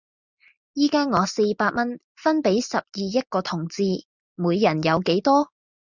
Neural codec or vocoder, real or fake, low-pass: none; real; 7.2 kHz